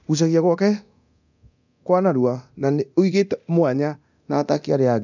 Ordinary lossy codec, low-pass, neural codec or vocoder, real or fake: none; 7.2 kHz; codec, 24 kHz, 0.9 kbps, DualCodec; fake